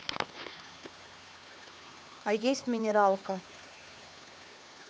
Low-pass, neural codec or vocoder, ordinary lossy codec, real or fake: none; codec, 16 kHz, 4 kbps, X-Codec, HuBERT features, trained on LibriSpeech; none; fake